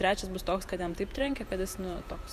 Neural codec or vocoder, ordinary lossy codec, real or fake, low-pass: vocoder, 48 kHz, 128 mel bands, Vocos; Opus, 64 kbps; fake; 14.4 kHz